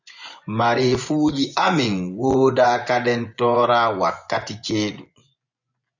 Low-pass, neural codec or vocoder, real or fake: 7.2 kHz; vocoder, 24 kHz, 100 mel bands, Vocos; fake